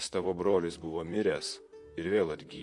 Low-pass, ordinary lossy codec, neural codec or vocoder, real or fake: 10.8 kHz; MP3, 64 kbps; vocoder, 44.1 kHz, 128 mel bands, Pupu-Vocoder; fake